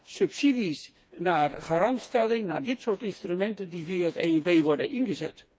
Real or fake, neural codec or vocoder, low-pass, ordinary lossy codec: fake; codec, 16 kHz, 2 kbps, FreqCodec, smaller model; none; none